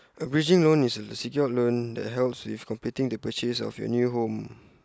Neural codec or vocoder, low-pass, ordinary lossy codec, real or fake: none; none; none; real